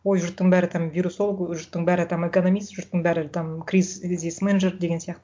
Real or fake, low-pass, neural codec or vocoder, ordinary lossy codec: real; none; none; none